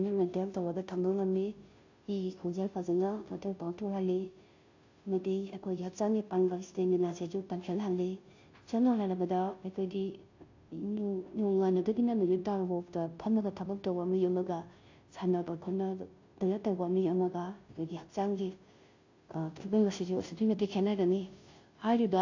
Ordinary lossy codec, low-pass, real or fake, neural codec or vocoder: AAC, 48 kbps; 7.2 kHz; fake; codec, 16 kHz, 0.5 kbps, FunCodec, trained on Chinese and English, 25 frames a second